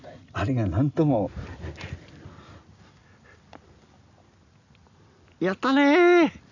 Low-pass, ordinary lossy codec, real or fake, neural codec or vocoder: 7.2 kHz; none; real; none